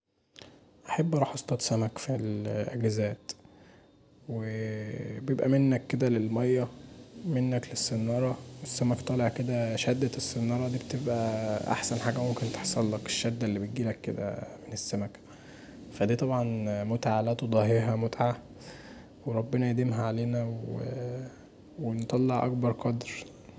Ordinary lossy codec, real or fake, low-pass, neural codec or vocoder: none; real; none; none